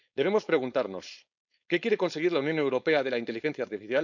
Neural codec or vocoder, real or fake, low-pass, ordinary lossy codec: codec, 16 kHz, 4.8 kbps, FACodec; fake; 7.2 kHz; none